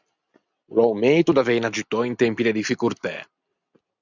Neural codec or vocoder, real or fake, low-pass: none; real; 7.2 kHz